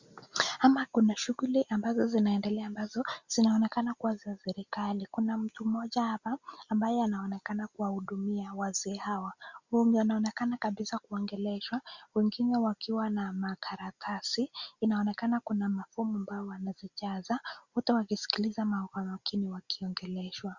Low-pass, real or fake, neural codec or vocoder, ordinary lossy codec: 7.2 kHz; real; none; Opus, 64 kbps